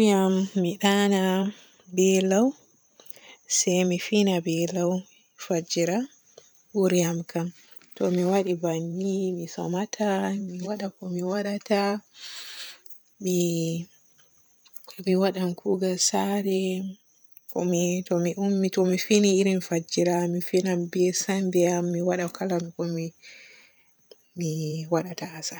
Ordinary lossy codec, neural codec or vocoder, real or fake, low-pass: none; vocoder, 44.1 kHz, 128 mel bands every 512 samples, BigVGAN v2; fake; none